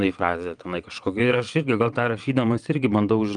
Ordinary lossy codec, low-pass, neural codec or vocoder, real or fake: Opus, 32 kbps; 9.9 kHz; vocoder, 22.05 kHz, 80 mel bands, WaveNeXt; fake